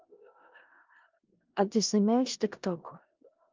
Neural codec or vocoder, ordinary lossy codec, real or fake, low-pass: codec, 16 kHz in and 24 kHz out, 0.4 kbps, LongCat-Audio-Codec, four codebook decoder; Opus, 24 kbps; fake; 7.2 kHz